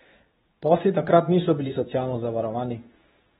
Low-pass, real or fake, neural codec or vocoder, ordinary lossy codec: 10.8 kHz; fake; codec, 24 kHz, 0.9 kbps, WavTokenizer, medium speech release version 2; AAC, 16 kbps